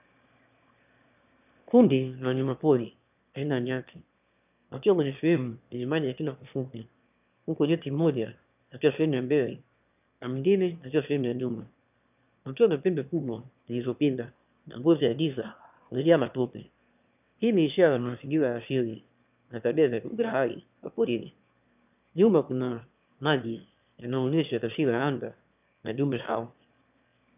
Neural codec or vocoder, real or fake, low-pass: autoencoder, 22.05 kHz, a latent of 192 numbers a frame, VITS, trained on one speaker; fake; 3.6 kHz